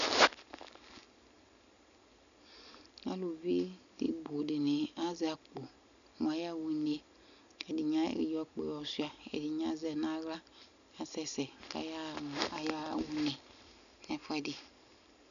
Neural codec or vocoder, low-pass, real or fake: none; 7.2 kHz; real